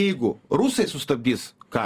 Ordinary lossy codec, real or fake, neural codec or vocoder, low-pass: Opus, 32 kbps; real; none; 14.4 kHz